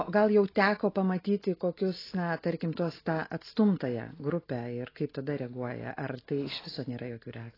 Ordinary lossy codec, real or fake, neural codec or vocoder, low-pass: AAC, 24 kbps; real; none; 5.4 kHz